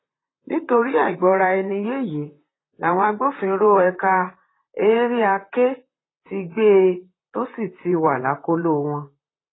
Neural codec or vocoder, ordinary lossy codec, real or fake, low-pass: vocoder, 44.1 kHz, 128 mel bands, Pupu-Vocoder; AAC, 16 kbps; fake; 7.2 kHz